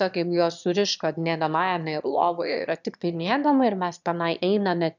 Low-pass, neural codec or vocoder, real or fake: 7.2 kHz; autoencoder, 22.05 kHz, a latent of 192 numbers a frame, VITS, trained on one speaker; fake